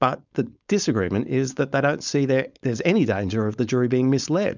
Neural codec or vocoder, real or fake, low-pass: codec, 16 kHz, 4.8 kbps, FACodec; fake; 7.2 kHz